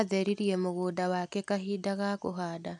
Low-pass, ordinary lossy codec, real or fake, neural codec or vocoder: 10.8 kHz; none; real; none